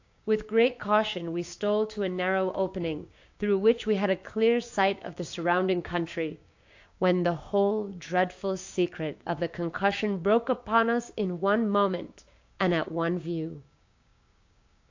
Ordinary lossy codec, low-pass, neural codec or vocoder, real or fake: AAC, 48 kbps; 7.2 kHz; codec, 16 kHz, 6 kbps, DAC; fake